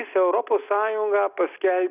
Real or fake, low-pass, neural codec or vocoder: real; 3.6 kHz; none